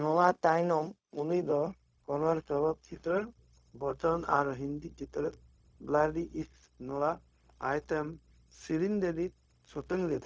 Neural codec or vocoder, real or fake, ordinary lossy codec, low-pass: codec, 16 kHz, 0.4 kbps, LongCat-Audio-Codec; fake; none; none